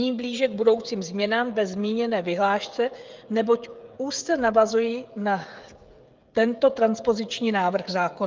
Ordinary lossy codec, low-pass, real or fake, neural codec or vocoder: Opus, 24 kbps; 7.2 kHz; fake; codec, 16 kHz, 16 kbps, FreqCodec, smaller model